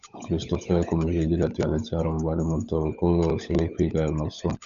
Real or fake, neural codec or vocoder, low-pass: fake; codec, 16 kHz, 16 kbps, FunCodec, trained on LibriTTS, 50 frames a second; 7.2 kHz